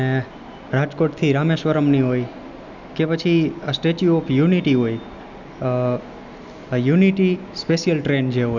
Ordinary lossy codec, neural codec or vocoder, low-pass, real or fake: none; none; 7.2 kHz; real